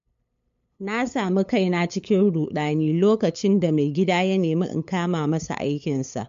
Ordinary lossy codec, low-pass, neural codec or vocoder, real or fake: MP3, 48 kbps; 7.2 kHz; codec, 16 kHz, 8 kbps, FunCodec, trained on LibriTTS, 25 frames a second; fake